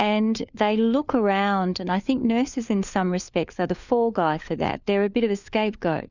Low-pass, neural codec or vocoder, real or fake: 7.2 kHz; codec, 16 kHz, 4 kbps, FunCodec, trained on LibriTTS, 50 frames a second; fake